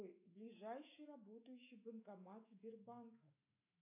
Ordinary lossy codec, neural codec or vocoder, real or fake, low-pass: AAC, 24 kbps; autoencoder, 48 kHz, 128 numbers a frame, DAC-VAE, trained on Japanese speech; fake; 3.6 kHz